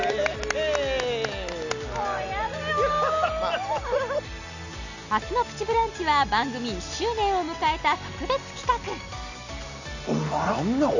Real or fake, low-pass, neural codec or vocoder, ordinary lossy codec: real; 7.2 kHz; none; none